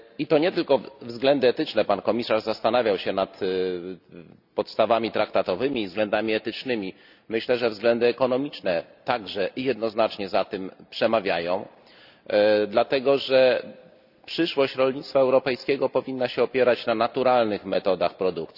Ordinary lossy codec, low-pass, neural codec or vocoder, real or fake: none; 5.4 kHz; none; real